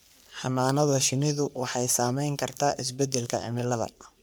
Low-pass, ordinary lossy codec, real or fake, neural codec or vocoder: none; none; fake; codec, 44.1 kHz, 3.4 kbps, Pupu-Codec